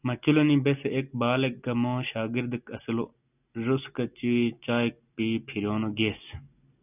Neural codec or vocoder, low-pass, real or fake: none; 3.6 kHz; real